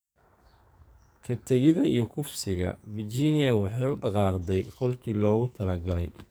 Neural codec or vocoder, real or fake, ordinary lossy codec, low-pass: codec, 44.1 kHz, 2.6 kbps, SNAC; fake; none; none